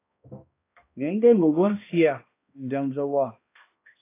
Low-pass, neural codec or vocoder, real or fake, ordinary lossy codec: 3.6 kHz; codec, 16 kHz, 1 kbps, X-Codec, HuBERT features, trained on balanced general audio; fake; AAC, 24 kbps